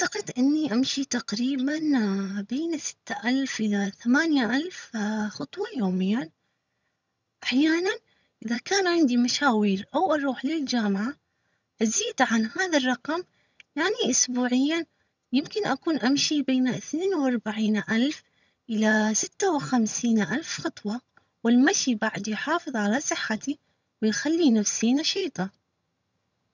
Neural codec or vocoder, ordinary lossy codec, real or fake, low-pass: vocoder, 22.05 kHz, 80 mel bands, HiFi-GAN; none; fake; 7.2 kHz